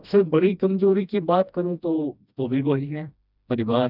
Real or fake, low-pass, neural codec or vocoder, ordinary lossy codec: fake; 5.4 kHz; codec, 16 kHz, 1 kbps, FreqCodec, smaller model; none